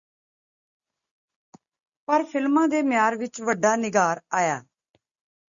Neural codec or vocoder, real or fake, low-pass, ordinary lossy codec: none; real; 7.2 kHz; Opus, 64 kbps